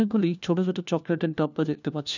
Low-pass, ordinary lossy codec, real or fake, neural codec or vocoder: 7.2 kHz; none; fake; codec, 16 kHz, 1 kbps, FunCodec, trained on LibriTTS, 50 frames a second